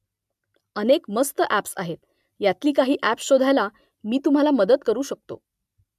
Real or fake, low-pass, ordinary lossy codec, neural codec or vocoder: real; 14.4 kHz; MP3, 96 kbps; none